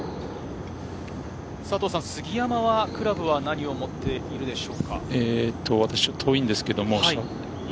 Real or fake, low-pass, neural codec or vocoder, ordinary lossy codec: real; none; none; none